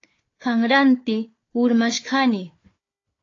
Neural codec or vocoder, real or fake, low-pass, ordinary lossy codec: codec, 16 kHz, 4 kbps, FunCodec, trained on Chinese and English, 50 frames a second; fake; 7.2 kHz; AAC, 32 kbps